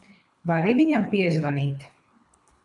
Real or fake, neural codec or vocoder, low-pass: fake; codec, 24 kHz, 3 kbps, HILCodec; 10.8 kHz